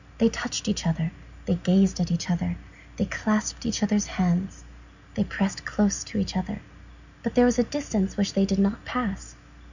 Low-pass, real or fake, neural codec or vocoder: 7.2 kHz; real; none